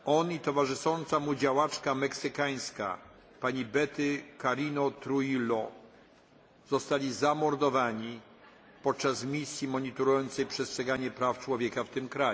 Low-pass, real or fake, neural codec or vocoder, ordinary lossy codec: none; real; none; none